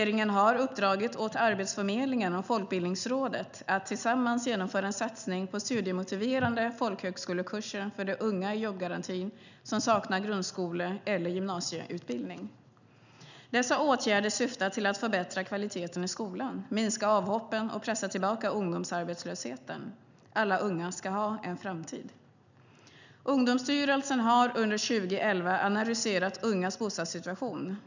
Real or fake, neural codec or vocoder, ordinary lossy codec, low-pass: real; none; none; 7.2 kHz